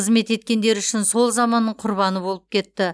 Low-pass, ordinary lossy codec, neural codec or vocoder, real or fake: none; none; none; real